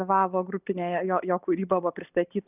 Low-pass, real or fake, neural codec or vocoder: 3.6 kHz; real; none